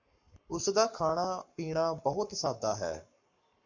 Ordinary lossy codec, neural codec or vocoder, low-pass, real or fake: MP3, 48 kbps; codec, 44.1 kHz, 7.8 kbps, Pupu-Codec; 7.2 kHz; fake